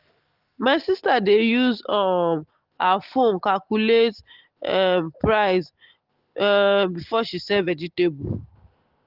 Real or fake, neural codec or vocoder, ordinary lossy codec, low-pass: real; none; Opus, 16 kbps; 5.4 kHz